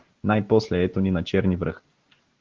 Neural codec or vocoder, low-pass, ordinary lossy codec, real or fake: none; 7.2 kHz; Opus, 16 kbps; real